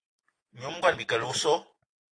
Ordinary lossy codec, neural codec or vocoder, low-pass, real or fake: AAC, 32 kbps; none; 9.9 kHz; real